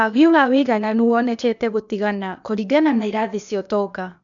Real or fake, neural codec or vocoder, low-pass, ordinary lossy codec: fake; codec, 16 kHz, 0.8 kbps, ZipCodec; 7.2 kHz; none